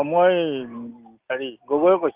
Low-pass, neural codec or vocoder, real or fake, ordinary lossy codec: 3.6 kHz; none; real; Opus, 32 kbps